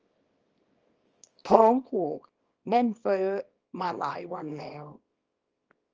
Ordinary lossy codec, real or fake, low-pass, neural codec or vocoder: Opus, 24 kbps; fake; 7.2 kHz; codec, 24 kHz, 0.9 kbps, WavTokenizer, small release